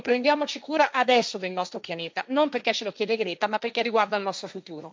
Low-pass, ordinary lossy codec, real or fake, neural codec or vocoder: none; none; fake; codec, 16 kHz, 1.1 kbps, Voila-Tokenizer